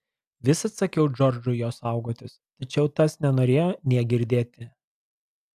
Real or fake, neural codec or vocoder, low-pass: real; none; 14.4 kHz